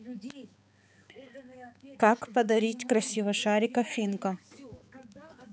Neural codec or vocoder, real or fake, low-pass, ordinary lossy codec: codec, 16 kHz, 4 kbps, X-Codec, HuBERT features, trained on balanced general audio; fake; none; none